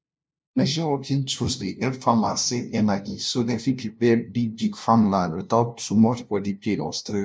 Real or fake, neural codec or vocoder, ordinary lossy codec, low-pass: fake; codec, 16 kHz, 0.5 kbps, FunCodec, trained on LibriTTS, 25 frames a second; none; none